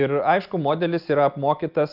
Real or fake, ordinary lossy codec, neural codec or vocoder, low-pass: real; Opus, 32 kbps; none; 5.4 kHz